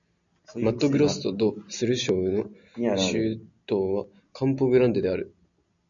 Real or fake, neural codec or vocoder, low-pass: real; none; 7.2 kHz